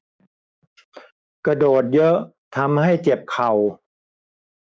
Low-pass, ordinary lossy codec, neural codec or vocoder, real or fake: none; none; codec, 16 kHz, 6 kbps, DAC; fake